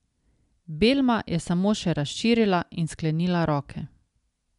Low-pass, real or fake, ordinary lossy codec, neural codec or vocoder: 10.8 kHz; real; MP3, 96 kbps; none